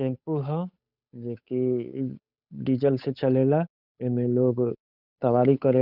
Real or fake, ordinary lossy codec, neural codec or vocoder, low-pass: fake; none; codec, 16 kHz, 8 kbps, FunCodec, trained on Chinese and English, 25 frames a second; 5.4 kHz